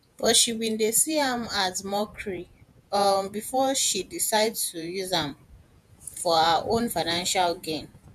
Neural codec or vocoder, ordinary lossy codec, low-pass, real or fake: vocoder, 48 kHz, 128 mel bands, Vocos; MP3, 96 kbps; 14.4 kHz; fake